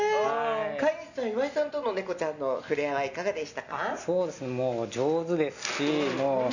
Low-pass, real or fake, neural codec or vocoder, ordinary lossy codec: 7.2 kHz; real; none; none